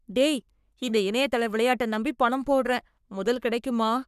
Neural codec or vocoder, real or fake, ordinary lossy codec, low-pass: codec, 44.1 kHz, 3.4 kbps, Pupu-Codec; fake; none; 14.4 kHz